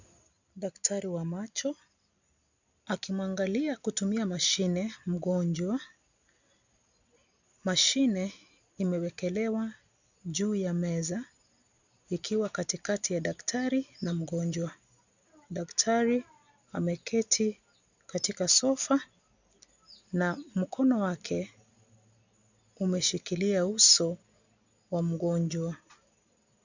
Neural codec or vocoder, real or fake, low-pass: none; real; 7.2 kHz